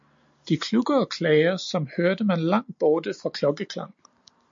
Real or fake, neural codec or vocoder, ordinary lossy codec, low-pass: real; none; MP3, 48 kbps; 7.2 kHz